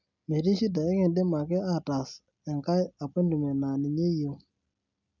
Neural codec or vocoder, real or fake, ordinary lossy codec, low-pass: none; real; none; 7.2 kHz